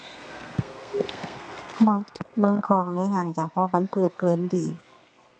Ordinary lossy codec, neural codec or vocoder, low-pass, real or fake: none; codec, 32 kHz, 1.9 kbps, SNAC; 9.9 kHz; fake